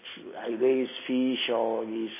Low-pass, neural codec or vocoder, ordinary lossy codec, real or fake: 3.6 kHz; none; MP3, 16 kbps; real